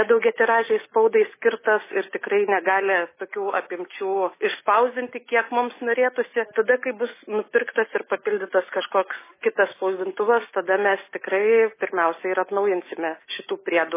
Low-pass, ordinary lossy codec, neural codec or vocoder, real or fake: 3.6 kHz; MP3, 16 kbps; none; real